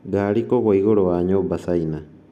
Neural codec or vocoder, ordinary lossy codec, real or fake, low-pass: none; none; real; none